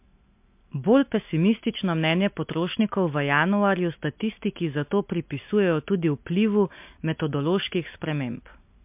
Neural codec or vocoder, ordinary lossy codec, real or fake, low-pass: none; MP3, 32 kbps; real; 3.6 kHz